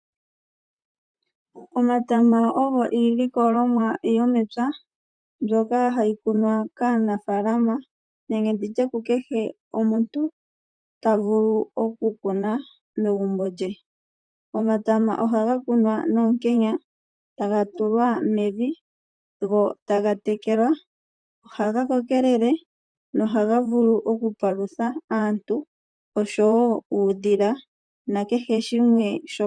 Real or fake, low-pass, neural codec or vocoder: fake; 9.9 kHz; vocoder, 44.1 kHz, 128 mel bands, Pupu-Vocoder